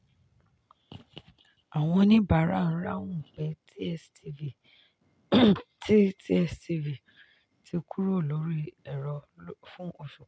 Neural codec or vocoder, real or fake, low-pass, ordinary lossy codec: none; real; none; none